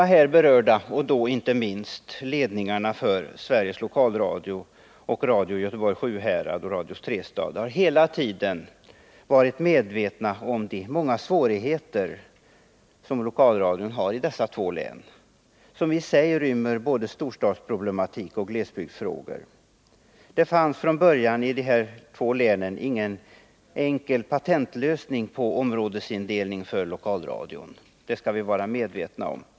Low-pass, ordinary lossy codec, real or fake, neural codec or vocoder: none; none; real; none